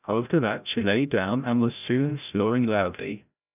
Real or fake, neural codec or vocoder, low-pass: fake; codec, 16 kHz, 0.5 kbps, FreqCodec, larger model; 3.6 kHz